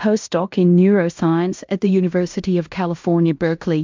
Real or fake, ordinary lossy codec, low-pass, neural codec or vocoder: fake; MP3, 64 kbps; 7.2 kHz; codec, 16 kHz in and 24 kHz out, 0.9 kbps, LongCat-Audio-Codec, fine tuned four codebook decoder